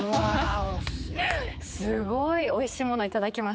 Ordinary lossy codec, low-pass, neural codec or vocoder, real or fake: none; none; codec, 16 kHz, 4 kbps, X-Codec, HuBERT features, trained on general audio; fake